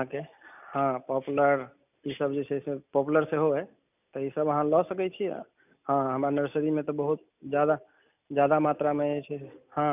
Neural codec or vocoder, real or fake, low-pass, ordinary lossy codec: none; real; 3.6 kHz; none